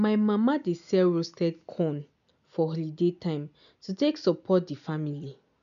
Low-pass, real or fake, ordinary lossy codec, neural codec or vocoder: 7.2 kHz; real; none; none